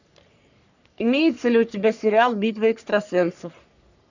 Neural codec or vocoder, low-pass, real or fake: codec, 44.1 kHz, 3.4 kbps, Pupu-Codec; 7.2 kHz; fake